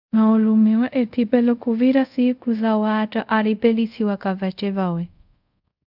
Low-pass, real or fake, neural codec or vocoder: 5.4 kHz; fake; codec, 24 kHz, 0.5 kbps, DualCodec